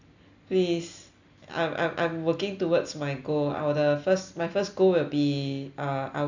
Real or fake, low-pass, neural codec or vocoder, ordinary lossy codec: real; 7.2 kHz; none; none